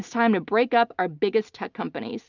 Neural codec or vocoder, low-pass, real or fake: none; 7.2 kHz; real